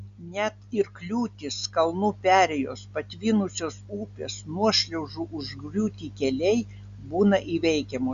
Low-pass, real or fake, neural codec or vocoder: 7.2 kHz; real; none